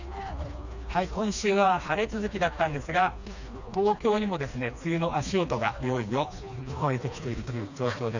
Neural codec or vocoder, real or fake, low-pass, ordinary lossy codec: codec, 16 kHz, 2 kbps, FreqCodec, smaller model; fake; 7.2 kHz; none